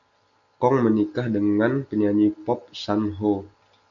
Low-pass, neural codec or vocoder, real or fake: 7.2 kHz; none; real